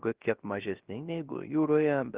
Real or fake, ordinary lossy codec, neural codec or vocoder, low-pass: fake; Opus, 16 kbps; codec, 16 kHz, 0.3 kbps, FocalCodec; 3.6 kHz